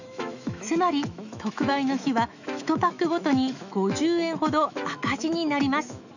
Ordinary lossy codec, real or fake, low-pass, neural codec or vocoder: none; fake; 7.2 kHz; autoencoder, 48 kHz, 128 numbers a frame, DAC-VAE, trained on Japanese speech